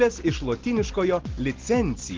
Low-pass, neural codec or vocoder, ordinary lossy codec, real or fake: 7.2 kHz; none; Opus, 16 kbps; real